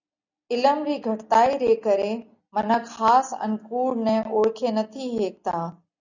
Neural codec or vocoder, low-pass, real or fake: none; 7.2 kHz; real